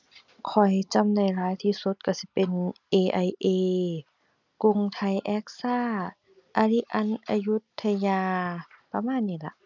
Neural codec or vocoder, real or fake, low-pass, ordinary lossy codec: none; real; 7.2 kHz; none